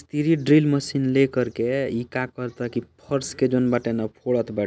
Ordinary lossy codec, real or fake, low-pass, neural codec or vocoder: none; real; none; none